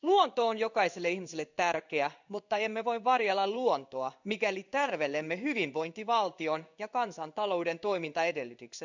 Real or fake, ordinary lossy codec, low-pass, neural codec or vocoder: fake; none; 7.2 kHz; codec, 16 kHz in and 24 kHz out, 1 kbps, XY-Tokenizer